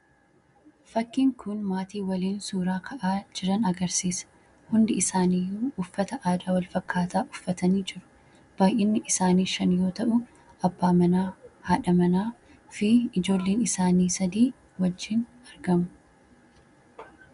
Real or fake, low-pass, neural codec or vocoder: real; 10.8 kHz; none